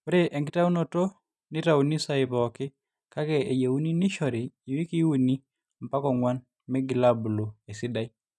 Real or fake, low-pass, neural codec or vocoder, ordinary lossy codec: real; none; none; none